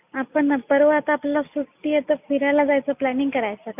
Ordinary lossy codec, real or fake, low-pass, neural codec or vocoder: none; real; 3.6 kHz; none